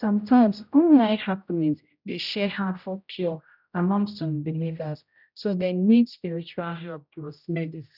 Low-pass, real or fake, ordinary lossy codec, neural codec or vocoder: 5.4 kHz; fake; none; codec, 16 kHz, 0.5 kbps, X-Codec, HuBERT features, trained on general audio